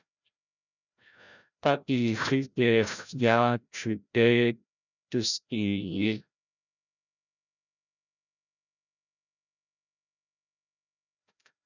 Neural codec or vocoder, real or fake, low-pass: codec, 16 kHz, 0.5 kbps, FreqCodec, larger model; fake; 7.2 kHz